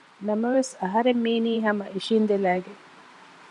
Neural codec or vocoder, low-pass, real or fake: vocoder, 44.1 kHz, 128 mel bands every 512 samples, BigVGAN v2; 10.8 kHz; fake